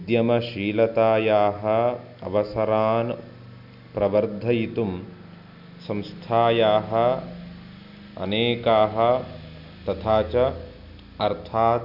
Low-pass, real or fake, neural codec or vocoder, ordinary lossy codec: 5.4 kHz; real; none; none